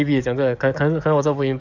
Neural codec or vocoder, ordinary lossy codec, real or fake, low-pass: codec, 44.1 kHz, 7.8 kbps, DAC; none; fake; 7.2 kHz